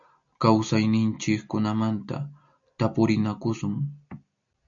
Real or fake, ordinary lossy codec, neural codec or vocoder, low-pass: real; MP3, 64 kbps; none; 7.2 kHz